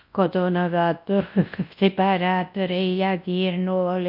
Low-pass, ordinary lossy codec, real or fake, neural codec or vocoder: 5.4 kHz; MP3, 32 kbps; fake; codec, 24 kHz, 0.9 kbps, WavTokenizer, large speech release